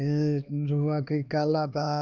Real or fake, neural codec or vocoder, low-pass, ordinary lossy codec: fake; codec, 16 kHz, 2 kbps, X-Codec, HuBERT features, trained on LibriSpeech; 7.2 kHz; none